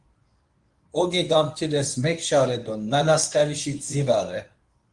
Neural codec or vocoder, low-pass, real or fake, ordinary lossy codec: codec, 24 kHz, 0.9 kbps, WavTokenizer, medium speech release version 1; 10.8 kHz; fake; Opus, 32 kbps